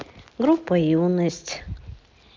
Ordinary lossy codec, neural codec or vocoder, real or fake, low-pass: Opus, 32 kbps; none; real; 7.2 kHz